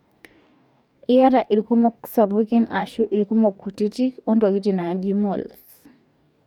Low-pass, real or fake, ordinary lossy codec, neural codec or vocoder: 19.8 kHz; fake; none; codec, 44.1 kHz, 2.6 kbps, DAC